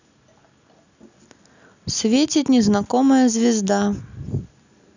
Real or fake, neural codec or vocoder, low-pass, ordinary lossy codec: real; none; 7.2 kHz; none